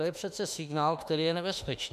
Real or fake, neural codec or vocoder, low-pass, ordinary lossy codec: fake; autoencoder, 48 kHz, 32 numbers a frame, DAC-VAE, trained on Japanese speech; 14.4 kHz; MP3, 96 kbps